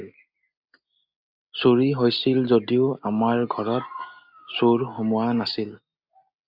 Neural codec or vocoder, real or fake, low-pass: none; real; 5.4 kHz